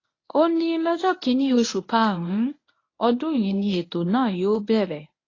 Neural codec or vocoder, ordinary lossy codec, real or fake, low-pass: codec, 24 kHz, 0.9 kbps, WavTokenizer, medium speech release version 1; AAC, 32 kbps; fake; 7.2 kHz